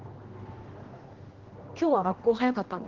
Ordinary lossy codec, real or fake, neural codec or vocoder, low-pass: Opus, 16 kbps; fake; codec, 16 kHz, 1 kbps, X-Codec, HuBERT features, trained on general audio; 7.2 kHz